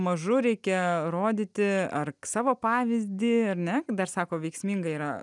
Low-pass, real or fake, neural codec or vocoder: 10.8 kHz; real; none